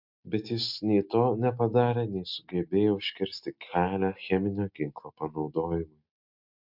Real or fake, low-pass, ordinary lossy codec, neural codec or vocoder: real; 5.4 kHz; MP3, 48 kbps; none